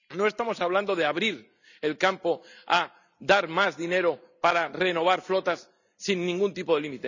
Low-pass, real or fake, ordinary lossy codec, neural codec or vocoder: 7.2 kHz; real; none; none